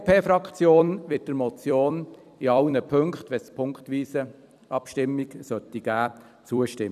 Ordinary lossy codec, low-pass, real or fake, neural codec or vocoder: none; 14.4 kHz; fake; vocoder, 44.1 kHz, 128 mel bands every 256 samples, BigVGAN v2